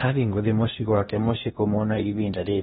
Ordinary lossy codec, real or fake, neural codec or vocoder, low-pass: AAC, 16 kbps; fake; codec, 16 kHz in and 24 kHz out, 0.8 kbps, FocalCodec, streaming, 65536 codes; 10.8 kHz